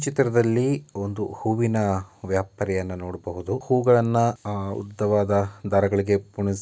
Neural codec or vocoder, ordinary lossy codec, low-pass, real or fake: none; none; none; real